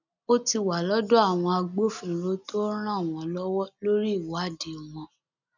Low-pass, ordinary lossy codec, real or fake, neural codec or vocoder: 7.2 kHz; none; real; none